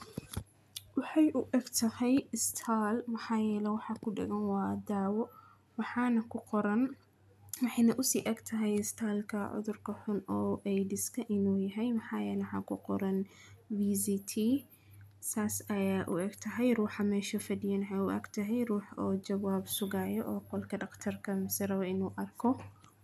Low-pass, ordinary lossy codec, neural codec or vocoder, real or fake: 14.4 kHz; none; none; real